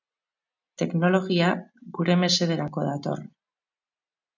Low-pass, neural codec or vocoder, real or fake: 7.2 kHz; none; real